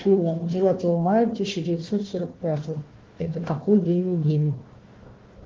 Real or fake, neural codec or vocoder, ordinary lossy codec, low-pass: fake; codec, 16 kHz, 1 kbps, FunCodec, trained on Chinese and English, 50 frames a second; Opus, 16 kbps; 7.2 kHz